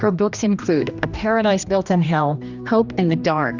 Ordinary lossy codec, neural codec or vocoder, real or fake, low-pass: Opus, 64 kbps; codec, 16 kHz, 1 kbps, X-Codec, HuBERT features, trained on general audio; fake; 7.2 kHz